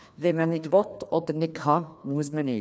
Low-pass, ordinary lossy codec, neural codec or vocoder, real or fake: none; none; codec, 16 kHz, 2 kbps, FreqCodec, larger model; fake